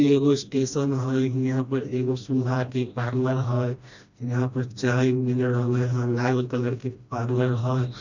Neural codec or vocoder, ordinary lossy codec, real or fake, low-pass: codec, 16 kHz, 1 kbps, FreqCodec, smaller model; none; fake; 7.2 kHz